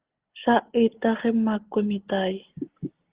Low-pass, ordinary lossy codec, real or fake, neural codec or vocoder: 3.6 kHz; Opus, 16 kbps; real; none